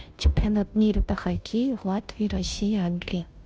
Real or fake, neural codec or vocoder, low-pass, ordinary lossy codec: fake; codec, 16 kHz, 0.5 kbps, FunCodec, trained on Chinese and English, 25 frames a second; none; none